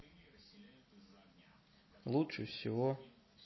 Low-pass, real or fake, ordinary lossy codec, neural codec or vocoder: 7.2 kHz; real; MP3, 24 kbps; none